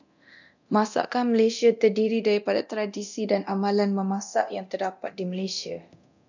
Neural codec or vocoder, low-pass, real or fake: codec, 24 kHz, 0.9 kbps, DualCodec; 7.2 kHz; fake